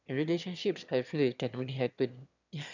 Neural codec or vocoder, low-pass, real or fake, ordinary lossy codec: autoencoder, 22.05 kHz, a latent of 192 numbers a frame, VITS, trained on one speaker; 7.2 kHz; fake; none